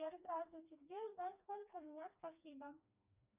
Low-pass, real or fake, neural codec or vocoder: 3.6 kHz; fake; codec, 16 kHz, 2 kbps, FreqCodec, smaller model